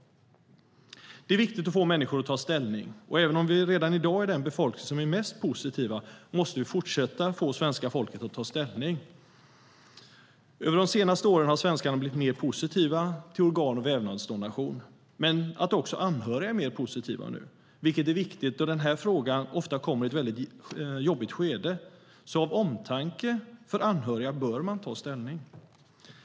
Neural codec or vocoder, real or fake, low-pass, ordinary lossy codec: none; real; none; none